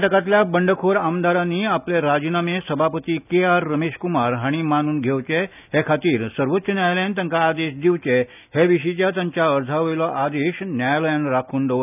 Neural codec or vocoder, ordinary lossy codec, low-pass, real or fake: none; none; 3.6 kHz; real